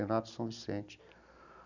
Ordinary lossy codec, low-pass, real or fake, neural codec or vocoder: none; 7.2 kHz; real; none